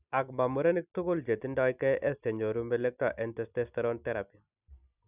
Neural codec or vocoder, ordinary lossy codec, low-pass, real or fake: vocoder, 44.1 kHz, 128 mel bands every 256 samples, BigVGAN v2; none; 3.6 kHz; fake